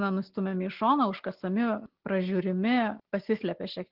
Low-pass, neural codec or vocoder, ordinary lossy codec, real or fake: 5.4 kHz; none; Opus, 16 kbps; real